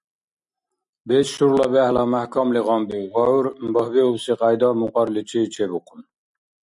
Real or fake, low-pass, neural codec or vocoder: real; 10.8 kHz; none